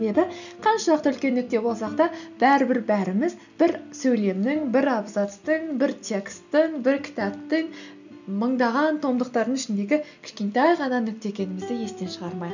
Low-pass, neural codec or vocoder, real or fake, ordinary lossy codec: 7.2 kHz; none; real; none